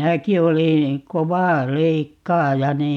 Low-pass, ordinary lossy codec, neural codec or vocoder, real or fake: 19.8 kHz; none; none; real